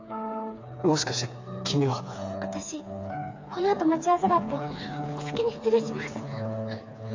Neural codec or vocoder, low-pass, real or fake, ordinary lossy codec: codec, 16 kHz, 4 kbps, FreqCodec, smaller model; 7.2 kHz; fake; AAC, 48 kbps